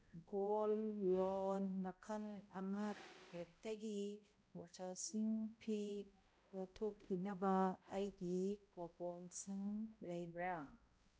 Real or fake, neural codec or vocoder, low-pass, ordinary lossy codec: fake; codec, 16 kHz, 0.5 kbps, X-Codec, HuBERT features, trained on balanced general audio; none; none